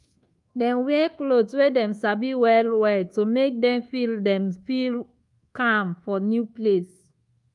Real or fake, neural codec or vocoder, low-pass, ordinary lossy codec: fake; codec, 24 kHz, 1.2 kbps, DualCodec; 10.8 kHz; Opus, 32 kbps